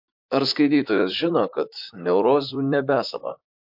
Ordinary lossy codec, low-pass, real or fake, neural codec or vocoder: MP3, 48 kbps; 5.4 kHz; fake; vocoder, 44.1 kHz, 128 mel bands, Pupu-Vocoder